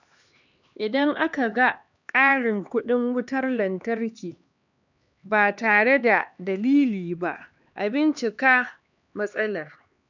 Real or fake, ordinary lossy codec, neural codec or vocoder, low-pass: fake; none; codec, 16 kHz, 2 kbps, X-Codec, HuBERT features, trained on LibriSpeech; 7.2 kHz